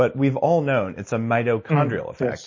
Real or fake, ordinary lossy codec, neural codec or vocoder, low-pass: real; MP3, 32 kbps; none; 7.2 kHz